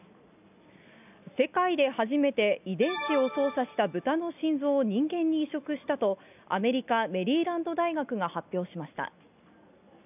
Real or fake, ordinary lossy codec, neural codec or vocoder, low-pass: real; none; none; 3.6 kHz